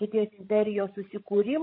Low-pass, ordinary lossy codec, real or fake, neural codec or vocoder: 5.4 kHz; MP3, 24 kbps; fake; codec, 16 kHz, 16 kbps, FreqCodec, larger model